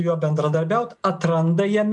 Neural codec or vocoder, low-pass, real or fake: none; 10.8 kHz; real